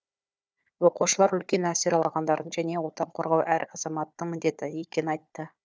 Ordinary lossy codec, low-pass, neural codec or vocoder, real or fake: none; none; codec, 16 kHz, 4 kbps, FunCodec, trained on Chinese and English, 50 frames a second; fake